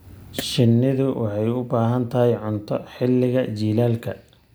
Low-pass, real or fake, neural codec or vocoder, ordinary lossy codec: none; real; none; none